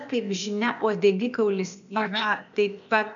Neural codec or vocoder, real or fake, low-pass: codec, 16 kHz, 0.8 kbps, ZipCodec; fake; 7.2 kHz